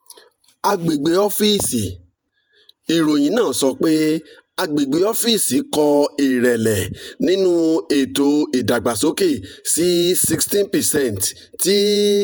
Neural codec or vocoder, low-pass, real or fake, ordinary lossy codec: vocoder, 48 kHz, 128 mel bands, Vocos; none; fake; none